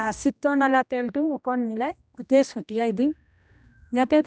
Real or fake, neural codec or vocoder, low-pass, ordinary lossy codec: fake; codec, 16 kHz, 1 kbps, X-Codec, HuBERT features, trained on general audio; none; none